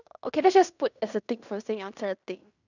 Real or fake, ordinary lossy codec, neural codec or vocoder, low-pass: fake; none; codec, 16 kHz in and 24 kHz out, 0.9 kbps, LongCat-Audio-Codec, fine tuned four codebook decoder; 7.2 kHz